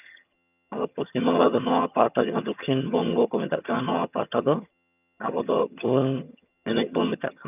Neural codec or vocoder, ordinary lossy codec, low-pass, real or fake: vocoder, 22.05 kHz, 80 mel bands, HiFi-GAN; none; 3.6 kHz; fake